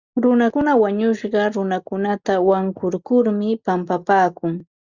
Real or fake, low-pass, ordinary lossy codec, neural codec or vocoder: real; 7.2 kHz; Opus, 64 kbps; none